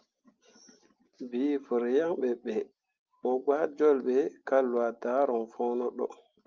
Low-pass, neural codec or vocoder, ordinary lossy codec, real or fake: 7.2 kHz; none; Opus, 24 kbps; real